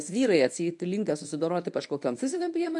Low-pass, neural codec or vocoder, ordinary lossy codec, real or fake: 10.8 kHz; codec, 24 kHz, 0.9 kbps, WavTokenizer, medium speech release version 1; AAC, 64 kbps; fake